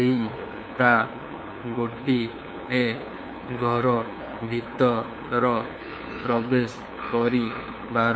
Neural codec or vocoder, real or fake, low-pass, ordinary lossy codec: codec, 16 kHz, 2 kbps, FunCodec, trained on LibriTTS, 25 frames a second; fake; none; none